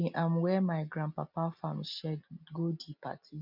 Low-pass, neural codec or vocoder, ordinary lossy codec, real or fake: 5.4 kHz; none; none; real